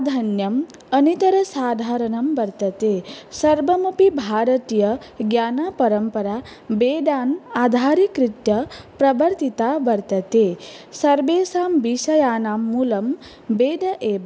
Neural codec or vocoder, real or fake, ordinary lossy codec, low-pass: none; real; none; none